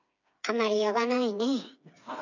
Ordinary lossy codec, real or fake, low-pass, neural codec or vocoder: none; fake; 7.2 kHz; codec, 16 kHz, 4 kbps, FreqCodec, smaller model